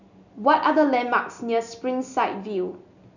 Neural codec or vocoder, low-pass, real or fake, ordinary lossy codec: none; 7.2 kHz; real; none